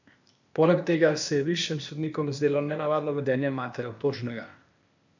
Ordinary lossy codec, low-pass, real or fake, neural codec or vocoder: none; 7.2 kHz; fake; codec, 16 kHz, 0.8 kbps, ZipCodec